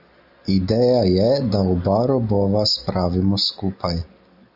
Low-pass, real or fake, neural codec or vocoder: 5.4 kHz; real; none